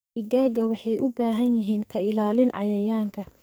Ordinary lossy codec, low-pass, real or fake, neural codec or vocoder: none; none; fake; codec, 44.1 kHz, 2.6 kbps, SNAC